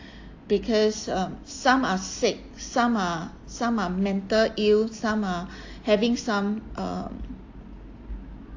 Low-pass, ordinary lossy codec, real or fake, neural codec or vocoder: 7.2 kHz; AAC, 48 kbps; real; none